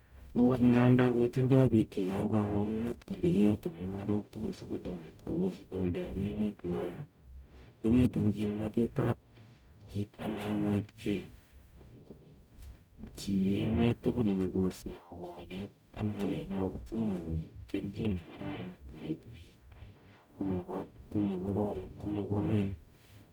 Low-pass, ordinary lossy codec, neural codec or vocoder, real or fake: 19.8 kHz; none; codec, 44.1 kHz, 0.9 kbps, DAC; fake